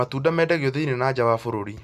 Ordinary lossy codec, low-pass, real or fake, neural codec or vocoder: none; 14.4 kHz; real; none